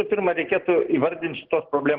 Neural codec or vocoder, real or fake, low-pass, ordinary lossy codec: none; real; 5.4 kHz; Opus, 16 kbps